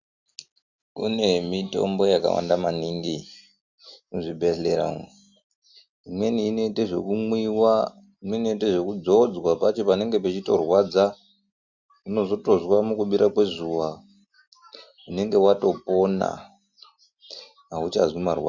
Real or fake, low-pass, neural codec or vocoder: real; 7.2 kHz; none